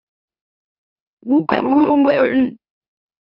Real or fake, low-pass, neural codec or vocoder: fake; 5.4 kHz; autoencoder, 44.1 kHz, a latent of 192 numbers a frame, MeloTTS